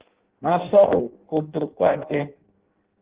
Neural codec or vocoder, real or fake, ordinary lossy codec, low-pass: codec, 16 kHz in and 24 kHz out, 0.6 kbps, FireRedTTS-2 codec; fake; Opus, 16 kbps; 3.6 kHz